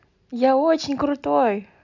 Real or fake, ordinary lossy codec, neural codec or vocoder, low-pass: real; none; none; 7.2 kHz